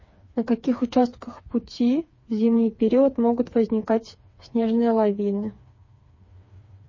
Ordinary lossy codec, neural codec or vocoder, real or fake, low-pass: MP3, 32 kbps; codec, 16 kHz, 4 kbps, FreqCodec, smaller model; fake; 7.2 kHz